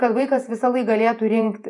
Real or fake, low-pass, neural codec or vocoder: fake; 10.8 kHz; vocoder, 48 kHz, 128 mel bands, Vocos